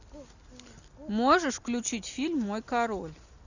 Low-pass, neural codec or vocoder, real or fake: 7.2 kHz; none; real